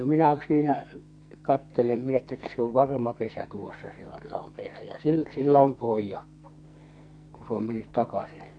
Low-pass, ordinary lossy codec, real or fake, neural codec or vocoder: 9.9 kHz; none; fake; codec, 44.1 kHz, 2.6 kbps, SNAC